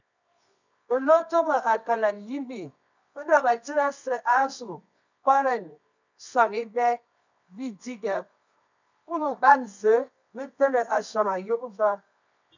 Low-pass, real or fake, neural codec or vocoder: 7.2 kHz; fake; codec, 24 kHz, 0.9 kbps, WavTokenizer, medium music audio release